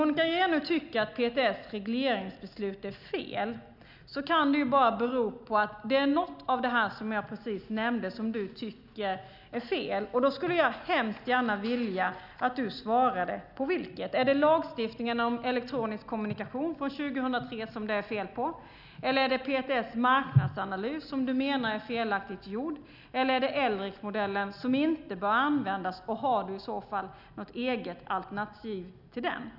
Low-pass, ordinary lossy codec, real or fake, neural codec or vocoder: 5.4 kHz; none; real; none